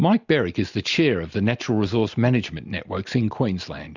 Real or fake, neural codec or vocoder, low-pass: real; none; 7.2 kHz